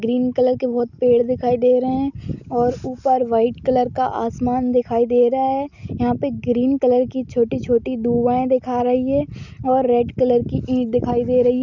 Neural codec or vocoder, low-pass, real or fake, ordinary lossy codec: none; 7.2 kHz; real; none